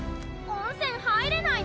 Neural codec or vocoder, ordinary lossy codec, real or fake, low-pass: none; none; real; none